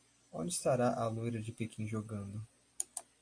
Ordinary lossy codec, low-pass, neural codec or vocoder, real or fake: AAC, 48 kbps; 9.9 kHz; none; real